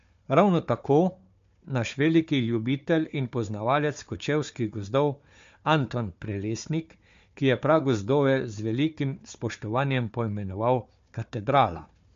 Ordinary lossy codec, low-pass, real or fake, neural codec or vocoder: MP3, 48 kbps; 7.2 kHz; fake; codec, 16 kHz, 4 kbps, FunCodec, trained on Chinese and English, 50 frames a second